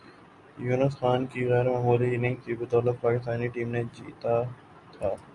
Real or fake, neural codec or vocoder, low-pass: real; none; 10.8 kHz